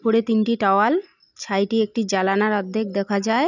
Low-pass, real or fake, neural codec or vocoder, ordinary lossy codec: 7.2 kHz; real; none; none